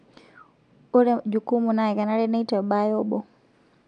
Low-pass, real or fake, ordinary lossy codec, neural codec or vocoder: 9.9 kHz; real; none; none